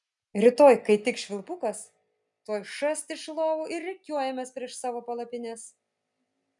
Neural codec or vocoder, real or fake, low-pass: none; real; 10.8 kHz